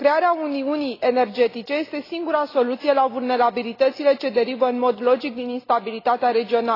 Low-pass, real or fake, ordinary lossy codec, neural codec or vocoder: 5.4 kHz; real; AAC, 24 kbps; none